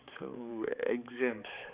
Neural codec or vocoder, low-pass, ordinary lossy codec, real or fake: codec, 16 kHz, 4 kbps, X-Codec, HuBERT features, trained on balanced general audio; 3.6 kHz; Opus, 64 kbps; fake